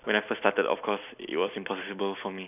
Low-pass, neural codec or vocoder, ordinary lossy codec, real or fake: 3.6 kHz; none; none; real